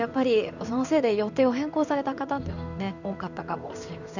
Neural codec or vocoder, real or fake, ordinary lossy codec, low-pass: codec, 16 kHz in and 24 kHz out, 1 kbps, XY-Tokenizer; fake; none; 7.2 kHz